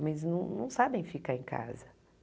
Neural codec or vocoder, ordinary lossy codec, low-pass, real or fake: none; none; none; real